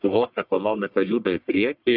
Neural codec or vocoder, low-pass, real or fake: codec, 44.1 kHz, 1.7 kbps, Pupu-Codec; 5.4 kHz; fake